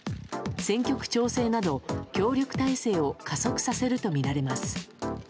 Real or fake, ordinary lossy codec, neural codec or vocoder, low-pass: real; none; none; none